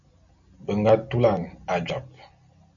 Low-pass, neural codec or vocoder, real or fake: 7.2 kHz; none; real